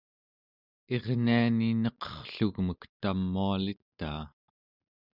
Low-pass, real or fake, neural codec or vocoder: 5.4 kHz; real; none